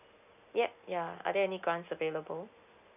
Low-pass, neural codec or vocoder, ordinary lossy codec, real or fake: 3.6 kHz; none; none; real